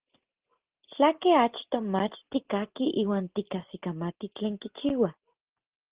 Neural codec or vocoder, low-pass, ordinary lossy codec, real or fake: none; 3.6 kHz; Opus, 16 kbps; real